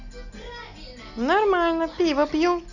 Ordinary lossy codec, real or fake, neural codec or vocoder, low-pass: none; real; none; 7.2 kHz